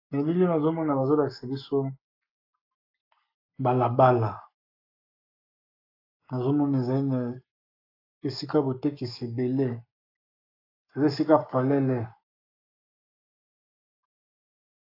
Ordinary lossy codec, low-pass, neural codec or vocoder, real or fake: AAC, 32 kbps; 5.4 kHz; codec, 44.1 kHz, 7.8 kbps, Pupu-Codec; fake